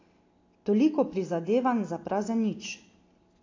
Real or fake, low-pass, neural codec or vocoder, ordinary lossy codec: real; 7.2 kHz; none; AAC, 32 kbps